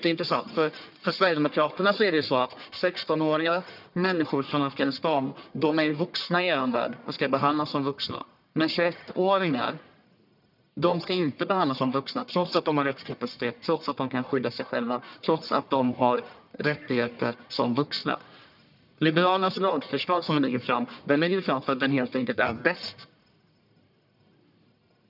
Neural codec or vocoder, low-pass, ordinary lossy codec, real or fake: codec, 44.1 kHz, 1.7 kbps, Pupu-Codec; 5.4 kHz; none; fake